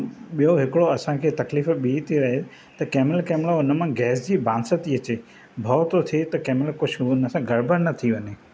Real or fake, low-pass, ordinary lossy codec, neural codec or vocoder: real; none; none; none